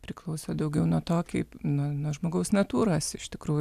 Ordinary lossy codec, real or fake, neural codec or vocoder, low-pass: AAC, 96 kbps; real; none; 14.4 kHz